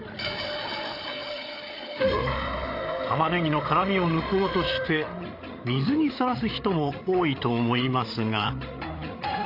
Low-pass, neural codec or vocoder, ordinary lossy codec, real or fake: 5.4 kHz; codec, 16 kHz, 8 kbps, FreqCodec, larger model; AAC, 32 kbps; fake